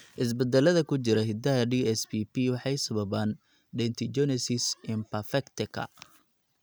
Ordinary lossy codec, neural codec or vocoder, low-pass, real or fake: none; none; none; real